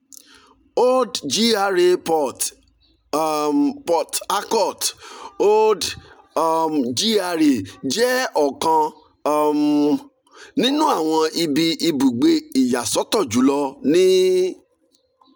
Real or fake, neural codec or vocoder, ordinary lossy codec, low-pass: real; none; none; none